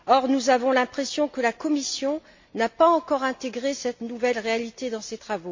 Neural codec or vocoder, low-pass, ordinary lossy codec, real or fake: none; 7.2 kHz; MP3, 48 kbps; real